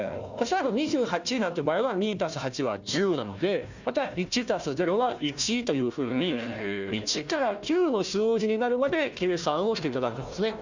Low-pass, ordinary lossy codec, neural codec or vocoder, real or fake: 7.2 kHz; Opus, 64 kbps; codec, 16 kHz, 1 kbps, FunCodec, trained on Chinese and English, 50 frames a second; fake